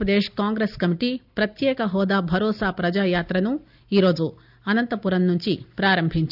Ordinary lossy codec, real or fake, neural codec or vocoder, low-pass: none; real; none; 5.4 kHz